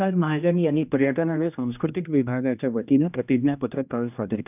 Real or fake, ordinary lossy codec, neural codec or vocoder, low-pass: fake; none; codec, 16 kHz, 1 kbps, X-Codec, HuBERT features, trained on general audio; 3.6 kHz